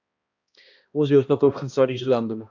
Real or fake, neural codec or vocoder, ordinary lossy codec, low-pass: fake; codec, 16 kHz, 1 kbps, X-Codec, HuBERT features, trained on balanced general audio; AAC, 48 kbps; 7.2 kHz